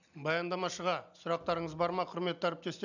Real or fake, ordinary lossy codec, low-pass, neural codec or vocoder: real; none; 7.2 kHz; none